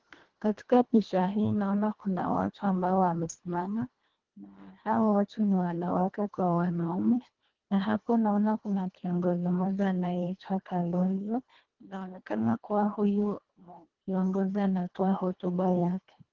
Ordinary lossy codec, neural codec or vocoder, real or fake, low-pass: Opus, 16 kbps; codec, 24 kHz, 1.5 kbps, HILCodec; fake; 7.2 kHz